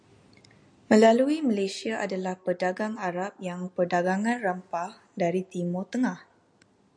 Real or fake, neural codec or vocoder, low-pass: real; none; 9.9 kHz